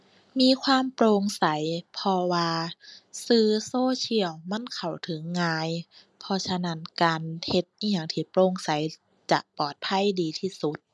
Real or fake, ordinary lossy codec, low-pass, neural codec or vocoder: real; none; none; none